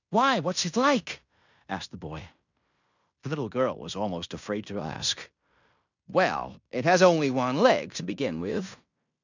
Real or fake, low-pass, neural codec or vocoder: fake; 7.2 kHz; codec, 16 kHz in and 24 kHz out, 0.9 kbps, LongCat-Audio-Codec, fine tuned four codebook decoder